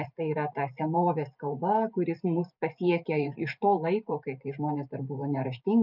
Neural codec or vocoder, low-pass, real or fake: vocoder, 44.1 kHz, 128 mel bands every 512 samples, BigVGAN v2; 5.4 kHz; fake